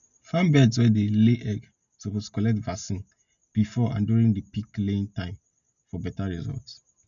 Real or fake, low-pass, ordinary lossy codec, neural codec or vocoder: real; 7.2 kHz; none; none